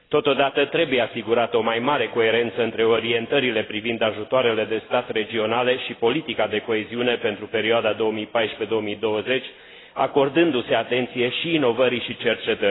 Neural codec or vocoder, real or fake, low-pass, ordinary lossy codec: none; real; 7.2 kHz; AAC, 16 kbps